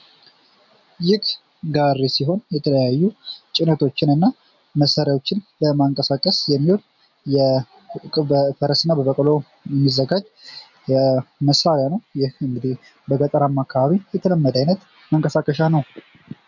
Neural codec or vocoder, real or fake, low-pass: none; real; 7.2 kHz